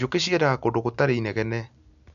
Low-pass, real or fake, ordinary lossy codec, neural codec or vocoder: 7.2 kHz; real; none; none